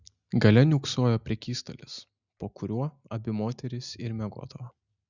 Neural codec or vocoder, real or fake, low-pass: none; real; 7.2 kHz